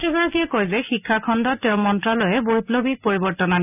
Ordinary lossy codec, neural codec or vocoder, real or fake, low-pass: none; none; real; 3.6 kHz